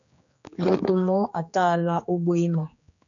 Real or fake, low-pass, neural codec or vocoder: fake; 7.2 kHz; codec, 16 kHz, 2 kbps, X-Codec, HuBERT features, trained on general audio